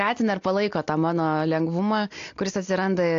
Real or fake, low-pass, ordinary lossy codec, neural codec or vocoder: real; 7.2 kHz; AAC, 48 kbps; none